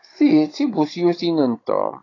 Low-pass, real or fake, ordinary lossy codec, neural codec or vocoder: 7.2 kHz; fake; AAC, 32 kbps; codec, 16 kHz, 16 kbps, FunCodec, trained on Chinese and English, 50 frames a second